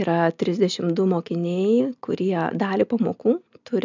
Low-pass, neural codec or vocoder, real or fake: 7.2 kHz; none; real